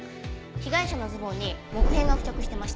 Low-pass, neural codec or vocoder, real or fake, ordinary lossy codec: none; none; real; none